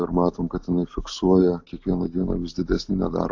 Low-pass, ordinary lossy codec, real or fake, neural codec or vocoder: 7.2 kHz; AAC, 48 kbps; real; none